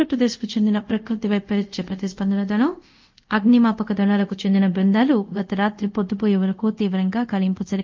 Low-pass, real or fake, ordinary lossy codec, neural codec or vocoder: 7.2 kHz; fake; Opus, 24 kbps; codec, 24 kHz, 0.5 kbps, DualCodec